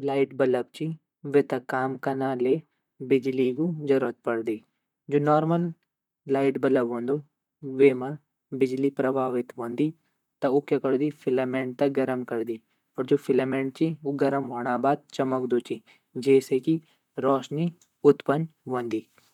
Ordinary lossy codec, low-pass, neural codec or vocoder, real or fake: none; 19.8 kHz; vocoder, 44.1 kHz, 128 mel bands, Pupu-Vocoder; fake